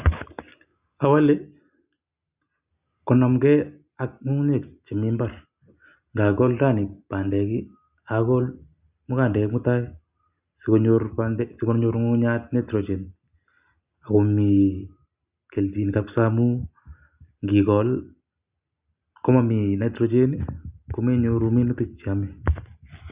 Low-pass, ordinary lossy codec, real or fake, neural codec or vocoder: 3.6 kHz; Opus, 64 kbps; real; none